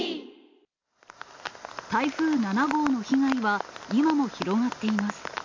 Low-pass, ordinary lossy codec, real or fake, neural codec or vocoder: 7.2 kHz; MP3, 48 kbps; real; none